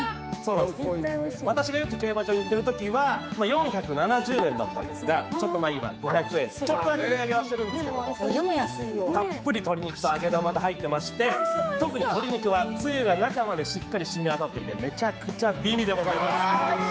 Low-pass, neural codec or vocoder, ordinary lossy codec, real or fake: none; codec, 16 kHz, 4 kbps, X-Codec, HuBERT features, trained on general audio; none; fake